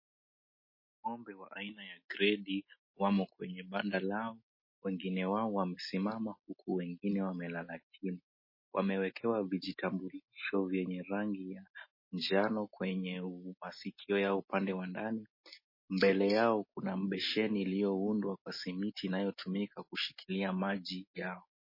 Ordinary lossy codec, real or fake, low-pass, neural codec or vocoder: MP3, 24 kbps; real; 5.4 kHz; none